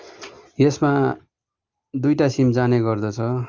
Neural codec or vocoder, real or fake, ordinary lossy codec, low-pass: none; real; Opus, 32 kbps; 7.2 kHz